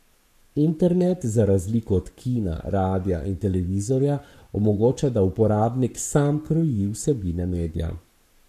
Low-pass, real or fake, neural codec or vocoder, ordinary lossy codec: 14.4 kHz; fake; codec, 44.1 kHz, 7.8 kbps, Pupu-Codec; none